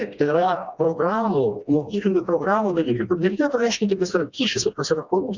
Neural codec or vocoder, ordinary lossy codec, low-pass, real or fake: codec, 16 kHz, 1 kbps, FreqCodec, smaller model; Opus, 64 kbps; 7.2 kHz; fake